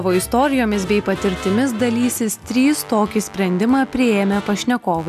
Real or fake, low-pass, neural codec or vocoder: real; 14.4 kHz; none